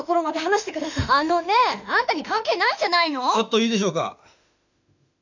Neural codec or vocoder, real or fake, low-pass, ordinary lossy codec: autoencoder, 48 kHz, 32 numbers a frame, DAC-VAE, trained on Japanese speech; fake; 7.2 kHz; none